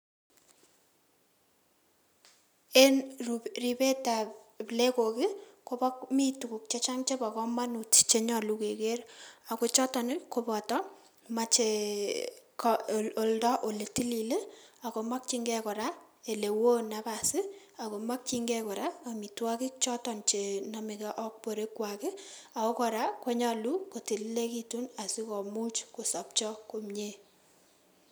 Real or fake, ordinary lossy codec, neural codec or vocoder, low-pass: real; none; none; none